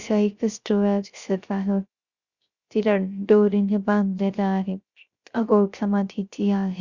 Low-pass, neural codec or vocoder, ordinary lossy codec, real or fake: 7.2 kHz; codec, 16 kHz, 0.3 kbps, FocalCodec; Opus, 64 kbps; fake